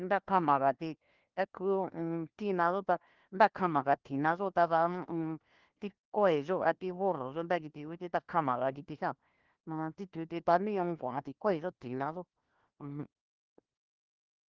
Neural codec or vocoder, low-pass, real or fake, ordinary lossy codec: codec, 16 kHz, 1 kbps, FunCodec, trained on LibriTTS, 50 frames a second; 7.2 kHz; fake; Opus, 16 kbps